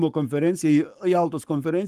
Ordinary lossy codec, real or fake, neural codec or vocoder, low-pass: Opus, 32 kbps; fake; codec, 44.1 kHz, 7.8 kbps, Pupu-Codec; 14.4 kHz